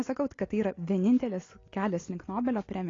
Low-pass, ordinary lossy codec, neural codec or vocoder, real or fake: 7.2 kHz; AAC, 32 kbps; none; real